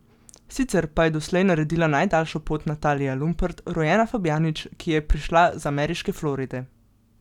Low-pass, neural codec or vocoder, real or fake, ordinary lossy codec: 19.8 kHz; none; real; none